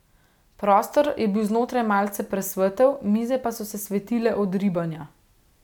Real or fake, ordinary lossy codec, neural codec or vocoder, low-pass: real; none; none; 19.8 kHz